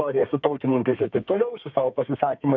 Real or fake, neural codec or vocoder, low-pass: fake; codec, 32 kHz, 1.9 kbps, SNAC; 7.2 kHz